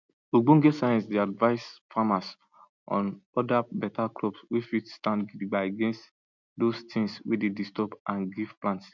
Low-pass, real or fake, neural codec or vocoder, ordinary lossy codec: 7.2 kHz; real; none; none